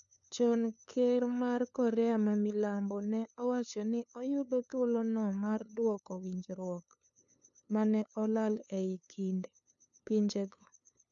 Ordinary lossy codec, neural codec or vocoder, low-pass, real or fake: none; codec, 16 kHz, 2 kbps, FunCodec, trained on LibriTTS, 25 frames a second; 7.2 kHz; fake